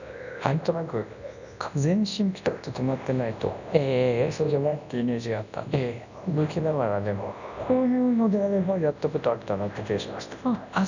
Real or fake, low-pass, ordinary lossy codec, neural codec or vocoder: fake; 7.2 kHz; none; codec, 24 kHz, 0.9 kbps, WavTokenizer, large speech release